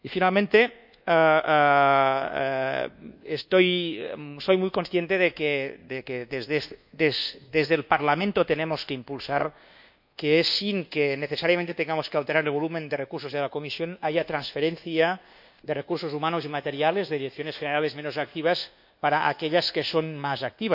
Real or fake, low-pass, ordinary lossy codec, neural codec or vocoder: fake; 5.4 kHz; none; codec, 24 kHz, 1.2 kbps, DualCodec